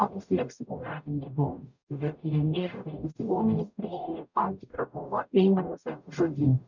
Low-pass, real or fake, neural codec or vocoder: 7.2 kHz; fake; codec, 44.1 kHz, 0.9 kbps, DAC